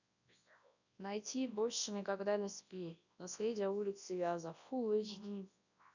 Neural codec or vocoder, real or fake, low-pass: codec, 24 kHz, 0.9 kbps, WavTokenizer, large speech release; fake; 7.2 kHz